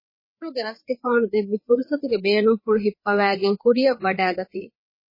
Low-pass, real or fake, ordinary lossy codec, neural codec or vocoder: 5.4 kHz; fake; MP3, 24 kbps; codec, 16 kHz, 4 kbps, FreqCodec, larger model